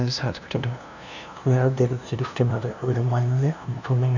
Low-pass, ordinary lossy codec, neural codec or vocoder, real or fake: 7.2 kHz; none; codec, 16 kHz, 0.5 kbps, FunCodec, trained on LibriTTS, 25 frames a second; fake